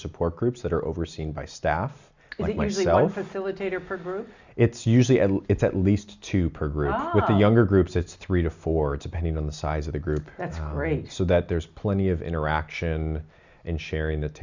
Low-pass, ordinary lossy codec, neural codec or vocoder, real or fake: 7.2 kHz; Opus, 64 kbps; none; real